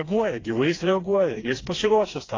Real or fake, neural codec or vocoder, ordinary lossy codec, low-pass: fake; codec, 16 kHz, 2 kbps, FreqCodec, smaller model; AAC, 32 kbps; 7.2 kHz